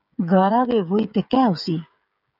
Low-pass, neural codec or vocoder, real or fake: 5.4 kHz; codec, 16 kHz, 16 kbps, FreqCodec, smaller model; fake